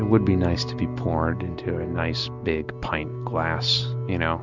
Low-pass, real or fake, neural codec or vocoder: 7.2 kHz; real; none